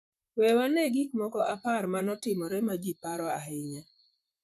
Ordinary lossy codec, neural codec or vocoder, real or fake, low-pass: none; vocoder, 44.1 kHz, 128 mel bands, Pupu-Vocoder; fake; 14.4 kHz